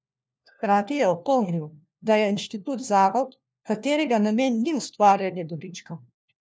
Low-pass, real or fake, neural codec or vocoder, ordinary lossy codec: none; fake; codec, 16 kHz, 1 kbps, FunCodec, trained on LibriTTS, 50 frames a second; none